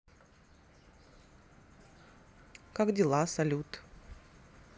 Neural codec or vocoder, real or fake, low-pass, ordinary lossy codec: none; real; none; none